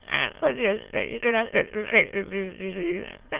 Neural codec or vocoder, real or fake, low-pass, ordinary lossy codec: autoencoder, 22.05 kHz, a latent of 192 numbers a frame, VITS, trained on many speakers; fake; 3.6 kHz; Opus, 16 kbps